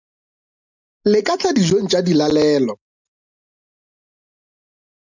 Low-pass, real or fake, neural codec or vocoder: 7.2 kHz; real; none